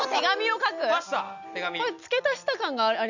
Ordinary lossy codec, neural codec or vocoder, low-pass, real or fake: none; none; 7.2 kHz; real